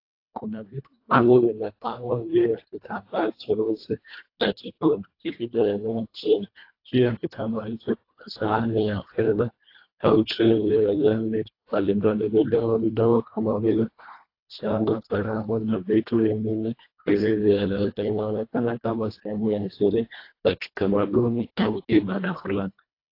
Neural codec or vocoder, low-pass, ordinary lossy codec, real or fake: codec, 24 kHz, 1.5 kbps, HILCodec; 5.4 kHz; AAC, 32 kbps; fake